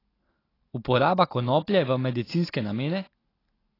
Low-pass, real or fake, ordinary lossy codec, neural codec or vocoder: 5.4 kHz; real; AAC, 24 kbps; none